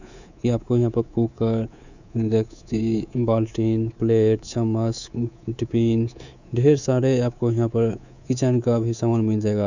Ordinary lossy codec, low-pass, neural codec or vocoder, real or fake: none; 7.2 kHz; codec, 24 kHz, 3.1 kbps, DualCodec; fake